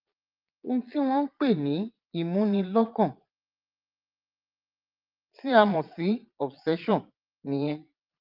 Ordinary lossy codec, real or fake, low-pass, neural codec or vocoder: Opus, 32 kbps; fake; 5.4 kHz; vocoder, 22.05 kHz, 80 mel bands, Vocos